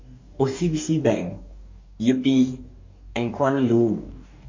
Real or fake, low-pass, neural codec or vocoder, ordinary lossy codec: fake; 7.2 kHz; codec, 44.1 kHz, 2.6 kbps, DAC; MP3, 48 kbps